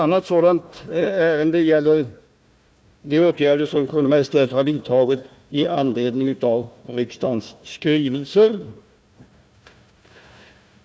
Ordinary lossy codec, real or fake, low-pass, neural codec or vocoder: none; fake; none; codec, 16 kHz, 1 kbps, FunCodec, trained on Chinese and English, 50 frames a second